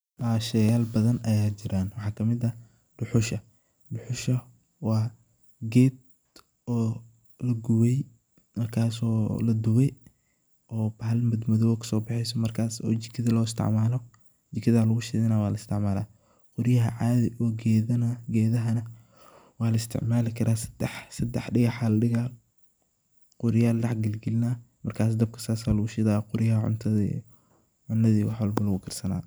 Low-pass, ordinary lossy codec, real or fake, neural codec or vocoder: none; none; real; none